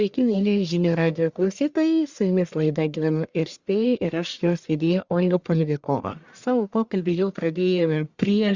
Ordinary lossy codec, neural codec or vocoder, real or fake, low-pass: Opus, 64 kbps; codec, 44.1 kHz, 1.7 kbps, Pupu-Codec; fake; 7.2 kHz